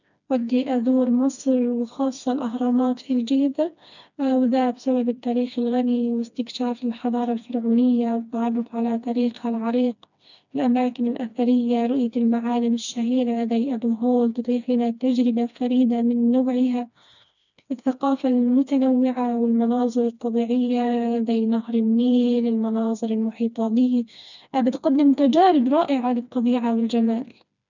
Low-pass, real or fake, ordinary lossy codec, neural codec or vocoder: 7.2 kHz; fake; none; codec, 16 kHz, 2 kbps, FreqCodec, smaller model